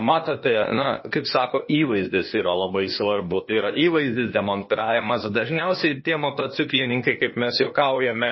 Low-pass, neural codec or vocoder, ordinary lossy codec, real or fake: 7.2 kHz; codec, 16 kHz, 0.8 kbps, ZipCodec; MP3, 24 kbps; fake